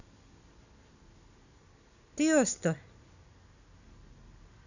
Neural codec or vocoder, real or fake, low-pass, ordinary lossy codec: none; real; 7.2 kHz; none